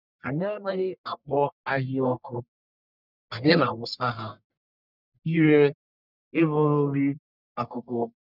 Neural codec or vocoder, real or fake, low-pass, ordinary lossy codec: codec, 44.1 kHz, 1.7 kbps, Pupu-Codec; fake; 5.4 kHz; none